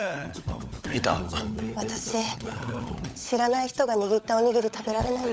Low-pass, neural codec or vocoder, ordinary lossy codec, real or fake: none; codec, 16 kHz, 16 kbps, FunCodec, trained on LibriTTS, 50 frames a second; none; fake